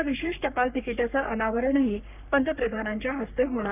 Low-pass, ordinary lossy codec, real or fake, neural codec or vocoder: 3.6 kHz; none; fake; codec, 44.1 kHz, 3.4 kbps, Pupu-Codec